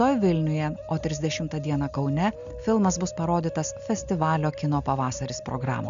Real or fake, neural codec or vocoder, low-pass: real; none; 7.2 kHz